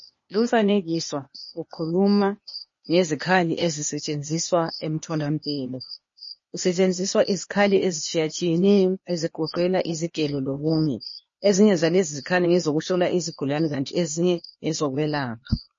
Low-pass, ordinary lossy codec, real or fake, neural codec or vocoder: 7.2 kHz; MP3, 32 kbps; fake; codec, 16 kHz, 0.8 kbps, ZipCodec